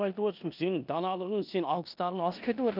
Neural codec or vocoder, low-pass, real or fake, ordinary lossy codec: codec, 16 kHz in and 24 kHz out, 0.9 kbps, LongCat-Audio-Codec, four codebook decoder; 5.4 kHz; fake; none